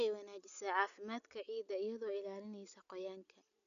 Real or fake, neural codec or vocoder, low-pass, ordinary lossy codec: real; none; 7.2 kHz; none